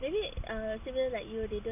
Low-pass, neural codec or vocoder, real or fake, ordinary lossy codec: 3.6 kHz; none; real; none